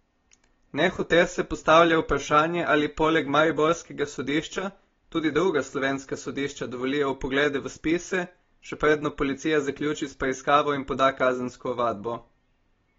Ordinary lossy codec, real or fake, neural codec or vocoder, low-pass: AAC, 24 kbps; real; none; 7.2 kHz